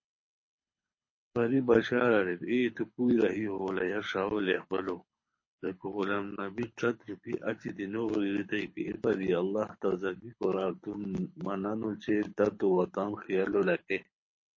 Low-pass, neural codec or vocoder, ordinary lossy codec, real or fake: 7.2 kHz; codec, 24 kHz, 6 kbps, HILCodec; MP3, 32 kbps; fake